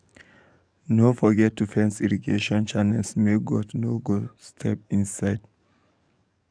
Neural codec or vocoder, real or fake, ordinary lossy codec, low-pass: codec, 44.1 kHz, 7.8 kbps, DAC; fake; none; 9.9 kHz